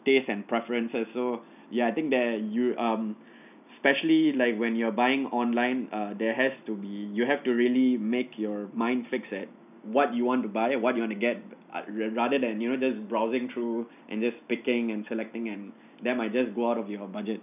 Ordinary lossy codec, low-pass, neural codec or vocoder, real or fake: none; 3.6 kHz; none; real